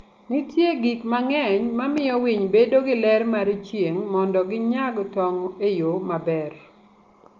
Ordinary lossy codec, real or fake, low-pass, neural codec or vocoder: Opus, 32 kbps; real; 7.2 kHz; none